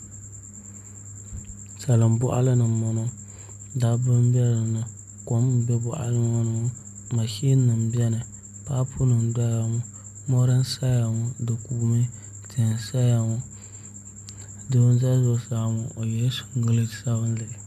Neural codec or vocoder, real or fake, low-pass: none; real; 14.4 kHz